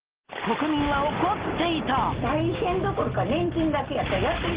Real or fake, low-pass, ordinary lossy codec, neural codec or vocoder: real; 3.6 kHz; Opus, 16 kbps; none